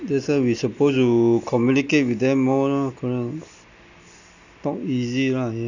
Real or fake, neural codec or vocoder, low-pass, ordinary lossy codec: real; none; 7.2 kHz; none